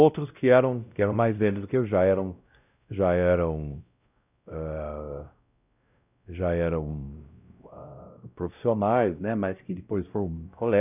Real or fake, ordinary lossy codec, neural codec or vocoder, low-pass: fake; none; codec, 16 kHz, 0.5 kbps, X-Codec, WavLM features, trained on Multilingual LibriSpeech; 3.6 kHz